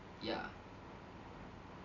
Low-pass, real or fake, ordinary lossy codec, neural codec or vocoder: 7.2 kHz; real; none; none